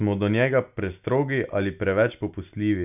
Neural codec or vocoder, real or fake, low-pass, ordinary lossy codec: none; real; 3.6 kHz; none